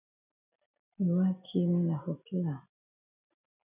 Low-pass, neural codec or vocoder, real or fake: 3.6 kHz; none; real